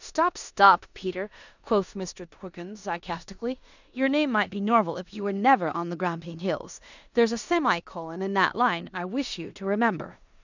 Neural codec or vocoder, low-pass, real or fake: codec, 16 kHz in and 24 kHz out, 0.9 kbps, LongCat-Audio-Codec, four codebook decoder; 7.2 kHz; fake